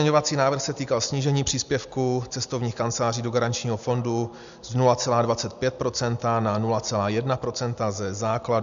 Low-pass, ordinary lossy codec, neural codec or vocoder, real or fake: 7.2 kHz; AAC, 96 kbps; none; real